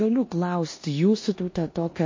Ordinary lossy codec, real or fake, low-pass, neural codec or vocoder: MP3, 32 kbps; fake; 7.2 kHz; codec, 16 kHz in and 24 kHz out, 0.9 kbps, LongCat-Audio-Codec, four codebook decoder